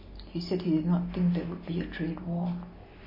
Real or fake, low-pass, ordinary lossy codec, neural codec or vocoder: real; 5.4 kHz; MP3, 24 kbps; none